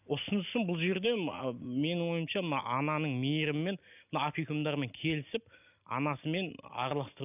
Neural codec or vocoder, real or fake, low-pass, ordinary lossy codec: none; real; 3.6 kHz; none